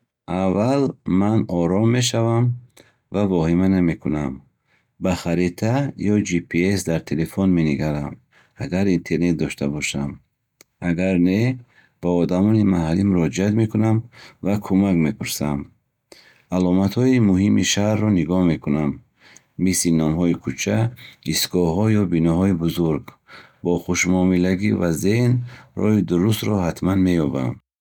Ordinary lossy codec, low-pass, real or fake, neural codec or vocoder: none; 19.8 kHz; real; none